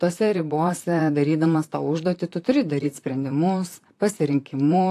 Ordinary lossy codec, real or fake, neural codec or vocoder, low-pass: AAC, 64 kbps; fake; vocoder, 44.1 kHz, 128 mel bands, Pupu-Vocoder; 14.4 kHz